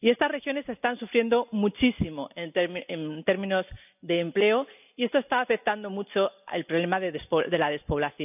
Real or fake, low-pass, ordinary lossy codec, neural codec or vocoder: real; 3.6 kHz; none; none